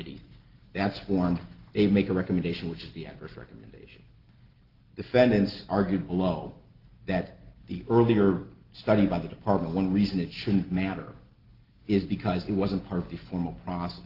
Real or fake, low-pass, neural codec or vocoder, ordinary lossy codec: real; 5.4 kHz; none; Opus, 16 kbps